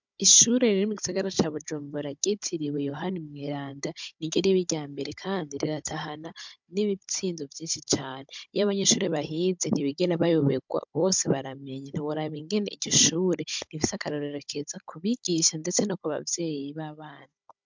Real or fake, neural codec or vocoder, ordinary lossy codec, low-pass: fake; codec, 16 kHz, 16 kbps, FunCodec, trained on Chinese and English, 50 frames a second; MP3, 64 kbps; 7.2 kHz